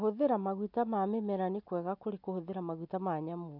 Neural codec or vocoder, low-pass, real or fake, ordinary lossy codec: none; 5.4 kHz; real; none